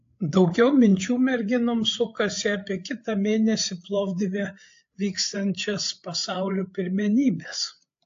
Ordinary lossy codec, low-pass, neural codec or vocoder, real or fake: AAC, 48 kbps; 7.2 kHz; codec, 16 kHz, 8 kbps, FreqCodec, larger model; fake